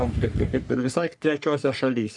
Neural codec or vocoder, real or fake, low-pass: codec, 44.1 kHz, 3.4 kbps, Pupu-Codec; fake; 10.8 kHz